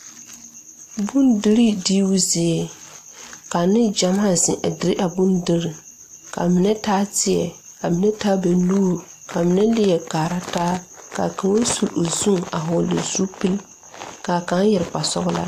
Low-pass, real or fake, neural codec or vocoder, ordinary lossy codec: 14.4 kHz; real; none; AAC, 64 kbps